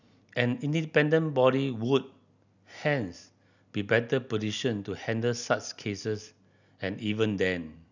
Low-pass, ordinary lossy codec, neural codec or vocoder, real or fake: 7.2 kHz; none; none; real